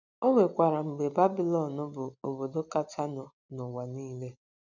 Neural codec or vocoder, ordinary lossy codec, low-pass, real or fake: none; none; 7.2 kHz; real